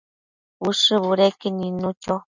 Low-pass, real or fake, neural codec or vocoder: 7.2 kHz; real; none